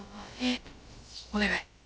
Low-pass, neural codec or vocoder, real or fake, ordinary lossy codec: none; codec, 16 kHz, about 1 kbps, DyCAST, with the encoder's durations; fake; none